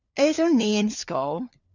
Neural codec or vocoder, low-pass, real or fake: codec, 16 kHz, 4 kbps, FunCodec, trained on LibriTTS, 50 frames a second; 7.2 kHz; fake